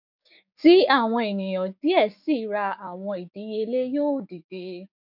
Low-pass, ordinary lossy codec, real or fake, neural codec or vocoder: 5.4 kHz; none; fake; codec, 16 kHz, 6 kbps, DAC